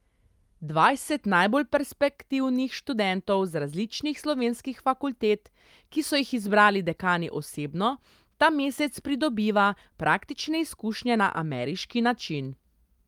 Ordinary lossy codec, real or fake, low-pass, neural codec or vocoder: Opus, 32 kbps; real; 19.8 kHz; none